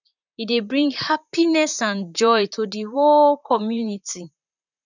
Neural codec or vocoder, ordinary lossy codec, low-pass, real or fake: vocoder, 44.1 kHz, 128 mel bands, Pupu-Vocoder; none; 7.2 kHz; fake